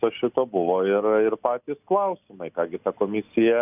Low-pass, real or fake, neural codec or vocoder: 3.6 kHz; real; none